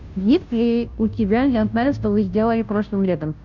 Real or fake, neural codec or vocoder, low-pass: fake; codec, 16 kHz, 0.5 kbps, FunCodec, trained on Chinese and English, 25 frames a second; 7.2 kHz